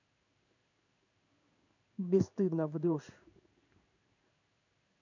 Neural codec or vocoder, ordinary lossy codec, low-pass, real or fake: codec, 16 kHz in and 24 kHz out, 1 kbps, XY-Tokenizer; none; 7.2 kHz; fake